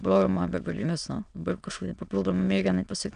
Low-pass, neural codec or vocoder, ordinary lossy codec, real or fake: 9.9 kHz; autoencoder, 22.05 kHz, a latent of 192 numbers a frame, VITS, trained on many speakers; MP3, 96 kbps; fake